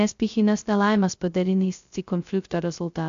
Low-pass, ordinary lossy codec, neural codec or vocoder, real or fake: 7.2 kHz; AAC, 64 kbps; codec, 16 kHz, 0.2 kbps, FocalCodec; fake